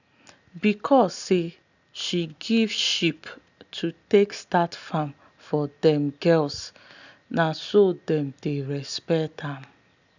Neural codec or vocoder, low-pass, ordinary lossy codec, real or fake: none; 7.2 kHz; none; real